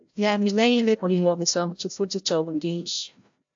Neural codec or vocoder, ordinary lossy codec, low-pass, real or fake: codec, 16 kHz, 0.5 kbps, FreqCodec, larger model; AAC, 64 kbps; 7.2 kHz; fake